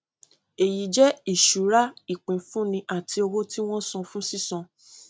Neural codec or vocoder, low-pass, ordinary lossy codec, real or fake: none; none; none; real